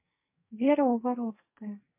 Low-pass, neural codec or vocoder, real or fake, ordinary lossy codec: 3.6 kHz; codec, 44.1 kHz, 2.6 kbps, SNAC; fake; MP3, 24 kbps